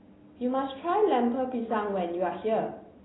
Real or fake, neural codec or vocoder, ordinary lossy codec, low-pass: real; none; AAC, 16 kbps; 7.2 kHz